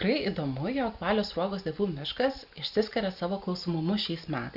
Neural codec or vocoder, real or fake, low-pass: none; real; 5.4 kHz